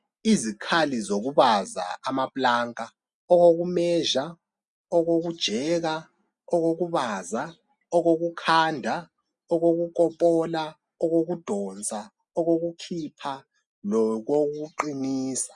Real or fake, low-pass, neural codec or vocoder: real; 10.8 kHz; none